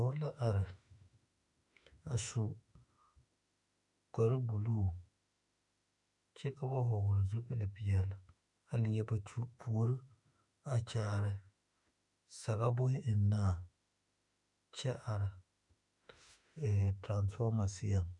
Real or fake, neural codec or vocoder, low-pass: fake; autoencoder, 48 kHz, 32 numbers a frame, DAC-VAE, trained on Japanese speech; 10.8 kHz